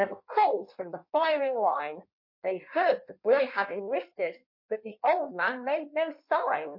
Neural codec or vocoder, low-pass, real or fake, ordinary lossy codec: codec, 16 kHz in and 24 kHz out, 1.1 kbps, FireRedTTS-2 codec; 5.4 kHz; fake; MP3, 32 kbps